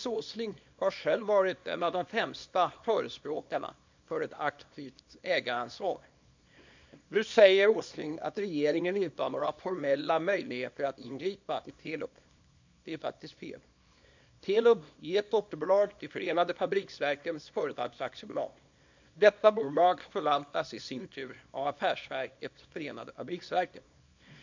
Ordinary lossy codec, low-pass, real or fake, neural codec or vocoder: MP3, 64 kbps; 7.2 kHz; fake; codec, 24 kHz, 0.9 kbps, WavTokenizer, small release